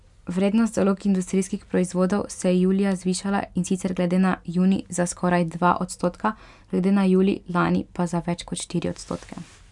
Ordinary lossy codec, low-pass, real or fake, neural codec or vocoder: none; 10.8 kHz; real; none